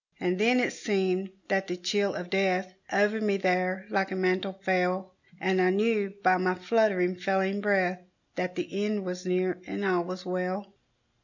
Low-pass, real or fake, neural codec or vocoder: 7.2 kHz; real; none